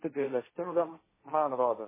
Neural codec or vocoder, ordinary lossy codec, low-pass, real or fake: codec, 16 kHz, 1.1 kbps, Voila-Tokenizer; MP3, 16 kbps; 3.6 kHz; fake